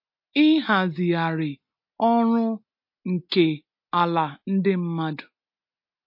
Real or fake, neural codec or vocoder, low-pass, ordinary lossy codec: real; none; 5.4 kHz; MP3, 32 kbps